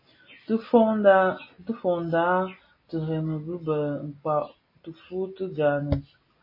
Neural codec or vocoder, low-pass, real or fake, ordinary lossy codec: none; 5.4 kHz; real; MP3, 24 kbps